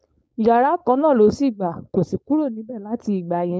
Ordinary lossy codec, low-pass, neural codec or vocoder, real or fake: none; none; codec, 16 kHz, 4.8 kbps, FACodec; fake